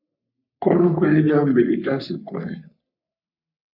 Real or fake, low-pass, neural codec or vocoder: fake; 5.4 kHz; codec, 44.1 kHz, 3.4 kbps, Pupu-Codec